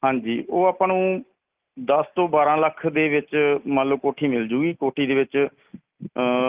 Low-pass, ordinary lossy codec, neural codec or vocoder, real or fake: 3.6 kHz; Opus, 32 kbps; none; real